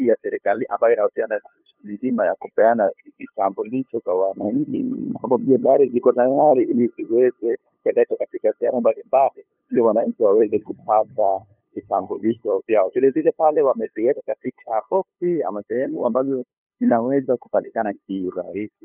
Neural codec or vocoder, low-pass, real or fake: codec, 16 kHz, 2 kbps, FunCodec, trained on LibriTTS, 25 frames a second; 3.6 kHz; fake